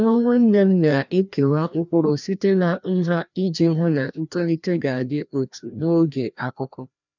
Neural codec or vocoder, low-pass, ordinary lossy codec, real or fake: codec, 16 kHz, 1 kbps, FreqCodec, larger model; 7.2 kHz; none; fake